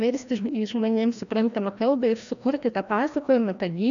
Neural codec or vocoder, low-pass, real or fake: codec, 16 kHz, 1 kbps, FreqCodec, larger model; 7.2 kHz; fake